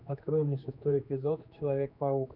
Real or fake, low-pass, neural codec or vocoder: fake; 5.4 kHz; codec, 16 kHz, 2 kbps, X-Codec, HuBERT features, trained on general audio